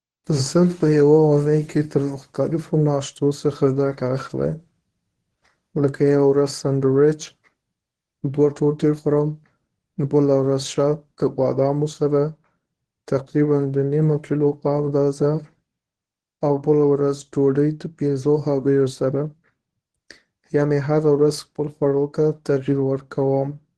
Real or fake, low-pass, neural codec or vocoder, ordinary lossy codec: fake; 10.8 kHz; codec, 24 kHz, 0.9 kbps, WavTokenizer, medium speech release version 1; Opus, 16 kbps